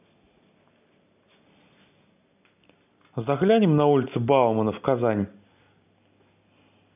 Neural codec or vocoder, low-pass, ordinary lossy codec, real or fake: none; 3.6 kHz; none; real